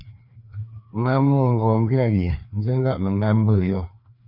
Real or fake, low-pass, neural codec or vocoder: fake; 5.4 kHz; codec, 16 kHz, 2 kbps, FreqCodec, larger model